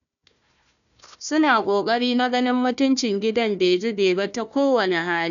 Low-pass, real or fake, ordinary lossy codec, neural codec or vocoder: 7.2 kHz; fake; none; codec, 16 kHz, 1 kbps, FunCodec, trained on Chinese and English, 50 frames a second